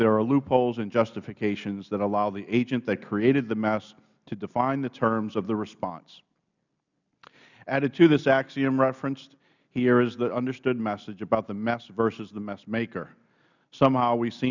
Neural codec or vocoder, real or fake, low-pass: none; real; 7.2 kHz